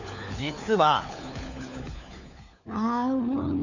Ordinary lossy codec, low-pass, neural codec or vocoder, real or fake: none; 7.2 kHz; codec, 16 kHz, 4 kbps, FunCodec, trained on LibriTTS, 50 frames a second; fake